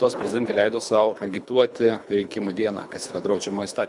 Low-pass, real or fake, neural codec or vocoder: 10.8 kHz; fake; codec, 24 kHz, 3 kbps, HILCodec